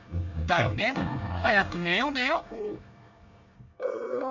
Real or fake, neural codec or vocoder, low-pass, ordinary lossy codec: fake; codec, 24 kHz, 1 kbps, SNAC; 7.2 kHz; none